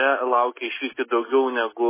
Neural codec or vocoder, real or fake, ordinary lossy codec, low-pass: none; real; MP3, 16 kbps; 3.6 kHz